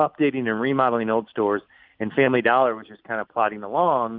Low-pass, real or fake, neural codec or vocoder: 5.4 kHz; real; none